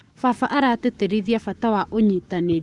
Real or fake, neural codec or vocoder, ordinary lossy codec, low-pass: fake; codec, 44.1 kHz, 7.8 kbps, Pupu-Codec; none; 10.8 kHz